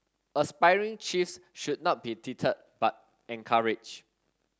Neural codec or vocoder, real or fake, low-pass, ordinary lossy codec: none; real; none; none